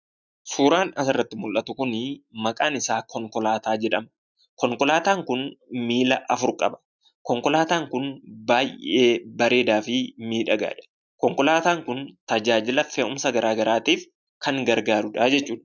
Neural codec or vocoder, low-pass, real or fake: none; 7.2 kHz; real